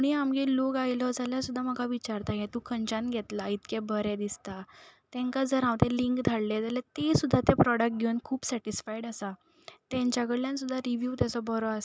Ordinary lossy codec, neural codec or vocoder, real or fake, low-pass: none; none; real; none